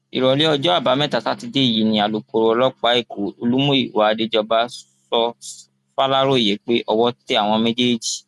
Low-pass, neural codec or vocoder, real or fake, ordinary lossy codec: 14.4 kHz; none; real; none